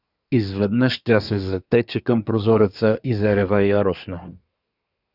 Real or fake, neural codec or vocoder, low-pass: fake; codec, 24 kHz, 1 kbps, SNAC; 5.4 kHz